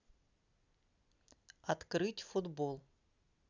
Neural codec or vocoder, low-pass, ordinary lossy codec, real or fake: none; 7.2 kHz; none; real